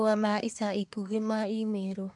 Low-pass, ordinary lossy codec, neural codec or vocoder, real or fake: 10.8 kHz; none; codec, 24 kHz, 1 kbps, SNAC; fake